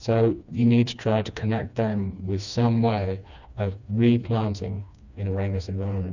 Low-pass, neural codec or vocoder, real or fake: 7.2 kHz; codec, 16 kHz, 2 kbps, FreqCodec, smaller model; fake